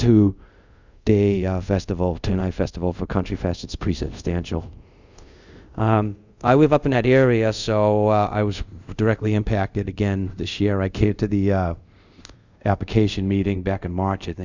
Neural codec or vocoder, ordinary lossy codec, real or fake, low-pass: codec, 24 kHz, 0.5 kbps, DualCodec; Opus, 64 kbps; fake; 7.2 kHz